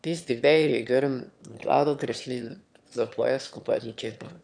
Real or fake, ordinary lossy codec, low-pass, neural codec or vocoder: fake; none; 9.9 kHz; autoencoder, 22.05 kHz, a latent of 192 numbers a frame, VITS, trained on one speaker